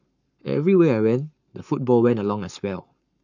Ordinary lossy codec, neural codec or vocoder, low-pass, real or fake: none; codec, 44.1 kHz, 7.8 kbps, Pupu-Codec; 7.2 kHz; fake